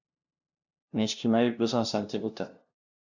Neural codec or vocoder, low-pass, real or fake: codec, 16 kHz, 0.5 kbps, FunCodec, trained on LibriTTS, 25 frames a second; 7.2 kHz; fake